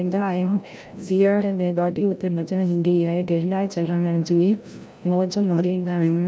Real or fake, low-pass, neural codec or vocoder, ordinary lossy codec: fake; none; codec, 16 kHz, 0.5 kbps, FreqCodec, larger model; none